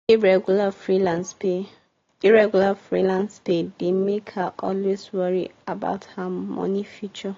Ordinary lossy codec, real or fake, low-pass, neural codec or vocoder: AAC, 32 kbps; real; 7.2 kHz; none